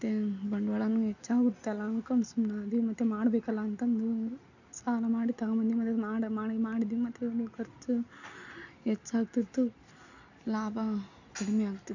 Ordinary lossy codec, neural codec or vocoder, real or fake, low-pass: none; none; real; 7.2 kHz